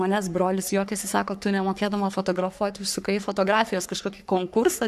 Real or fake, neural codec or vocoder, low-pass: fake; codec, 44.1 kHz, 3.4 kbps, Pupu-Codec; 14.4 kHz